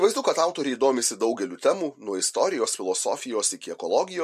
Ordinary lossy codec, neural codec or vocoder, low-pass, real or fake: MP3, 64 kbps; none; 14.4 kHz; real